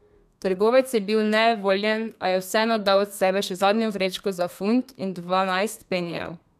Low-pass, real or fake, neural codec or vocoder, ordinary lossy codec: 14.4 kHz; fake; codec, 32 kHz, 1.9 kbps, SNAC; none